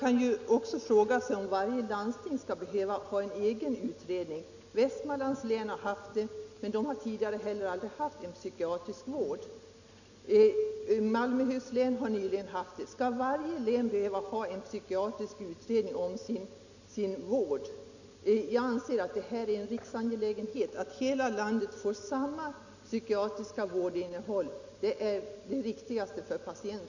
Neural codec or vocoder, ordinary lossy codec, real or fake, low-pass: none; none; real; 7.2 kHz